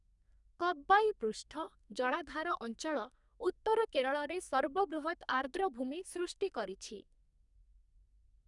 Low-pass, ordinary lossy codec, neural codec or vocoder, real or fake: 10.8 kHz; none; codec, 32 kHz, 1.9 kbps, SNAC; fake